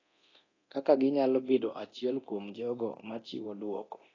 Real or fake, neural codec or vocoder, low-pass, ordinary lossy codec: fake; codec, 24 kHz, 0.9 kbps, DualCodec; 7.2 kHz; AAC, 32 kbps